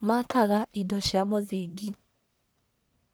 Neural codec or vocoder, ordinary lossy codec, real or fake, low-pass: codec, 44.1 kHz, 1.7 kbps, Pupu-Codec; none; fake; none